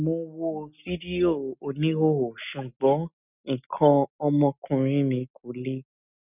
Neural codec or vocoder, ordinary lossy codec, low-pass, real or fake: none; none; 3.6 kHz; real